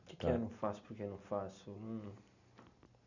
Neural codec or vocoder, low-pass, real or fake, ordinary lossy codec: none; 7.2 kHz; real; none